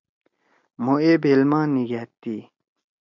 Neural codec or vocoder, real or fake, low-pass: none; real; 7.2 kHz